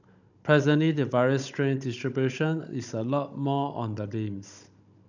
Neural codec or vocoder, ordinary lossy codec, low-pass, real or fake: codec, 16 kHz, 16 kbps, FunCodec, trained on Chinese and English, 50 frames a second; none; 7.2 kHz; fake